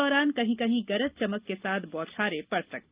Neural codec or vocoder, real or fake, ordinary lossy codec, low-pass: none; real; Opus, 32 kbps; 3.6 kHz